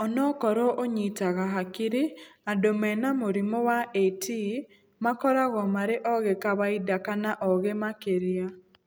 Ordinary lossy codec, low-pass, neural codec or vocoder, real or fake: none; none; none; real